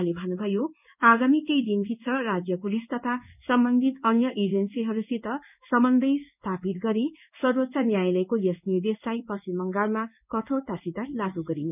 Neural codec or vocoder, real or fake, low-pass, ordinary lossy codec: codec, 16 kHz in and 24 kHz out, 1 kbps, XY-Tokenizer; fake; 3.6 kHz; none